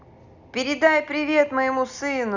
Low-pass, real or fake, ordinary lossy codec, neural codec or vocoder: 7.2 kHz; real; none; none